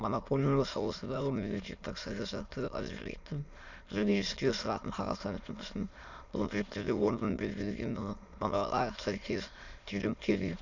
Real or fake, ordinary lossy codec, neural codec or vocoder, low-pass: fake; none; autoencoder, 22.05 kHz, a latent of 192 numbers a frame, VITS, trained on many speakers; 7.2 kHz